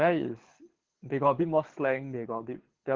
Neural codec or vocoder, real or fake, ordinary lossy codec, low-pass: codec, 24 kHz, 6 kbps, HILCodec; fake; Opus, 16 kbps; 7.2 kHz